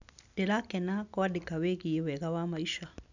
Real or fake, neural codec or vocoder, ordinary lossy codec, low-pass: real; none; none; 7.2 kHz